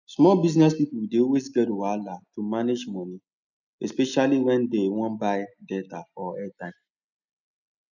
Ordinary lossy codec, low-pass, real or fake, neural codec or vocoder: none; 7.2 kHz; real; none